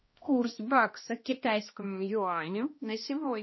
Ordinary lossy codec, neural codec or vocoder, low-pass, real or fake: MP3, 24 kbps; codec, 16 kHz, 1 kbps, X-Codec, HuBERT features, trained on balanced general audio; 7.2 kHz; fake